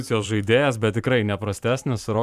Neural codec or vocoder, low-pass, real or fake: vocoder, 44.1 kHz, 128 mel bands every 512 samples, BigVGAN v2; 14.4 kHz; fake